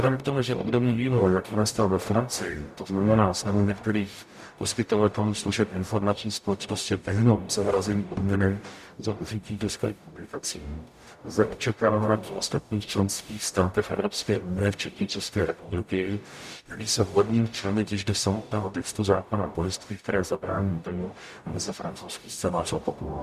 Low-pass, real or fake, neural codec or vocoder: 14.4 kHz; fake; codec, 44.1 kHz, 0.9 kbps, DAC